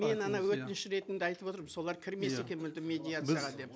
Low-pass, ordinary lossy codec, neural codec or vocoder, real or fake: none; none; none; real